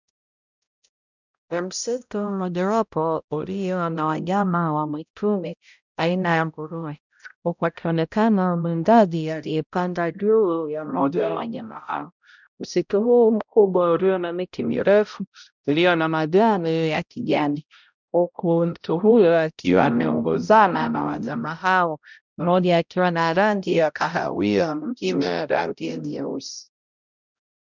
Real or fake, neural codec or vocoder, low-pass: fake; codec, 16 kHz, 0.5 kbps, X-Codec, HuBERT features, trained on balanced general audio; 7.2 kHz